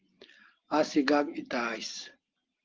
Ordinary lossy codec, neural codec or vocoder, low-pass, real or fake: Opus, 16 kbps; none; 7.2 kHz; real